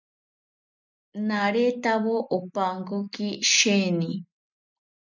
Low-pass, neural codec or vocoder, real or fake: 7.2 kHz; none; real